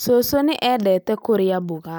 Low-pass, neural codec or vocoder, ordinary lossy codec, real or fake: none; none; none; real